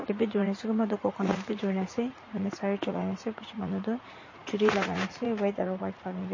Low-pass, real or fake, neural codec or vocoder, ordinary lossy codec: 7.2 kHz; fake; vocoder, 44.1 kHz, 80 mel bands, Vocos; MP3, 32 kbps